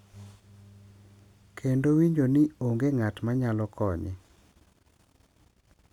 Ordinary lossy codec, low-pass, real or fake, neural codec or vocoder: none; 19.8 kHz; real; none